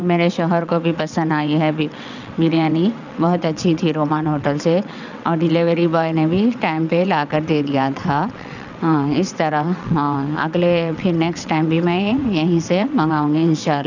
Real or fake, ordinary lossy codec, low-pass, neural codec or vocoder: fake; none; 7.2 kHz; vocoder, 22.05 kHz, 80 mel bands, WaveNeXt